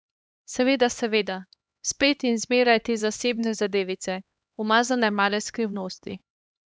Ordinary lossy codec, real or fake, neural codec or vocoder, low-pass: none; fake; codec, 16 kHz, 2 kbps, X-Codec, HuBERT features, trained on LibriSpeech; none